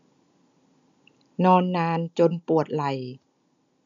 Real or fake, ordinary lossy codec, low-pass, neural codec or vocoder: real; none; 7.2 kHz; none